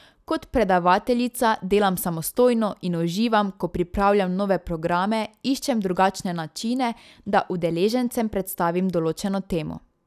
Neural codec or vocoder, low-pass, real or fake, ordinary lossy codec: none; 14.4 kHz; real; none